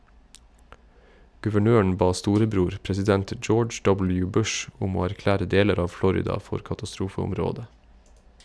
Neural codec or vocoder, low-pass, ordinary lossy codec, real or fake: none; none; none; real